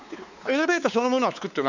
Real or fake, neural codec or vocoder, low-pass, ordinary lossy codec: fake; codec, 16 kHz, 4 kbps, X-Codec, WavLM features, trained on Multilingual LibriSpeech; 7.2 kHz; none